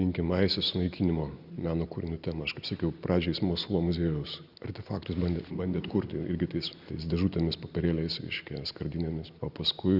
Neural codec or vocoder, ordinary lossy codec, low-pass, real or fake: none; Opus, 64 kbps; 5.4 kHz; real